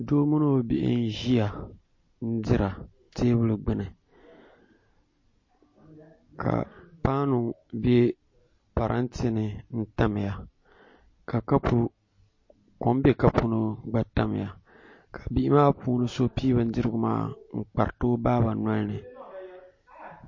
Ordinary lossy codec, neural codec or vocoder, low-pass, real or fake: MP3, 32 kbps; none; 7.2 kHz; real